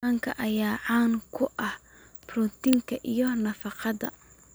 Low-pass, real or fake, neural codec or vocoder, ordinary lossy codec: none; real; none; none